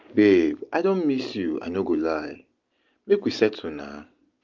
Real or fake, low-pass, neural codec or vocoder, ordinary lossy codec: real; 7.2 kHz; none; Opus, 24 kbps